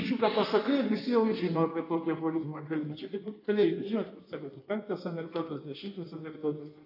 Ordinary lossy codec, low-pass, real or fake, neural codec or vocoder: AAC, 48 kbps; 5.4 kHz; fake; codec, 16 kHz in and 24 kHz out, 1.1 kbps, FireRedTTS-2 codec